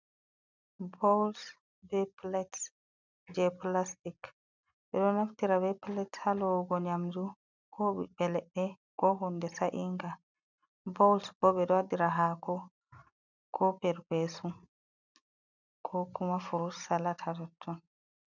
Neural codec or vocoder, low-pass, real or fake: none; 7.2 kHz; real